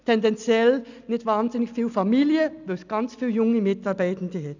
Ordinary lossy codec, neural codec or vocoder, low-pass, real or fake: none; none; 7.2 kHz; real